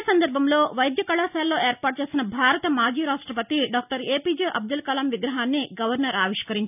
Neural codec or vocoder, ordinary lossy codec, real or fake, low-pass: none; none; real; 3.6 kHz